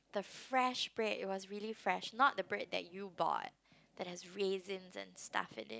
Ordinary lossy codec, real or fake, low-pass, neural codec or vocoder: none; real; none; none